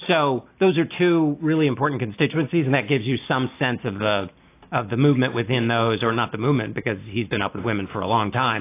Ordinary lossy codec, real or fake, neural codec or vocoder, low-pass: AAC, 24 kbps; real; none; 3.6 kHz